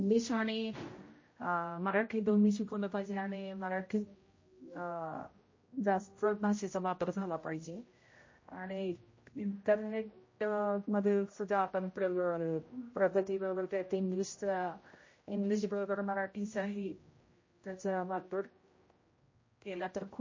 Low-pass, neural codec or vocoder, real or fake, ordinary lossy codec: 7.2 kHz; codec, 16 kHz, 0.5 kbps, X-Codec, HuBERT features, trained on general audio; fake; MP3, 32 kbps